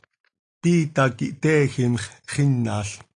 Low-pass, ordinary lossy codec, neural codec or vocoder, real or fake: 9.9 kHz; AAC, 48 kbps; none; real